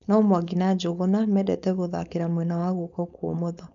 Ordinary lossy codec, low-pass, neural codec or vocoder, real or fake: none; 7.2 kHz; codec, 16 kHz, 4.8 kbps, FACodec; fake